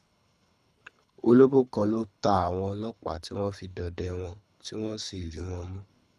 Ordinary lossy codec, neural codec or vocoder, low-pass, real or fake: none; codec, 24 kHz, 3 kbps, HILCodec; none; fake